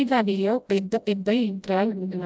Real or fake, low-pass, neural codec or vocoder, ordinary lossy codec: fake; none; codec, 16 kHz, 0.5 kbps, FreqCodec, smaller model; none